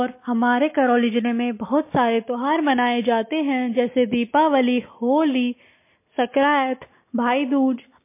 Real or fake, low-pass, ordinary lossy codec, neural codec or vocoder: real; 3.6 kHz; MP3, 24 kbps; none